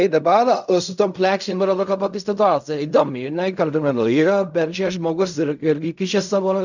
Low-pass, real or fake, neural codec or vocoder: 7.2 kHz; fake; codec, 16 kHz in and 24 kHz out, 0.4 kbps, LongCat-Audio-Codec, fine tuned four codebook decoder